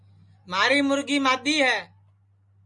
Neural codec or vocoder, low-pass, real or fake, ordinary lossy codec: none; 10.8 kHz; real; Opus, 64 kbps